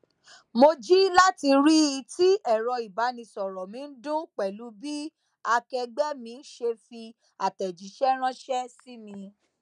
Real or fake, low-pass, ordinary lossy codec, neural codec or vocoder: real; 10.8 kHz; none; none